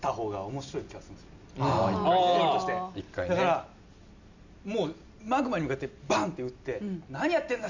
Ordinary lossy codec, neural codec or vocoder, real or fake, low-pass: none; none; real; 7.2 kHz